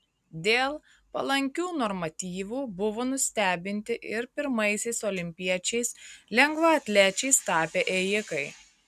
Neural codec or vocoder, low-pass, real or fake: none; 14.4 kHz; real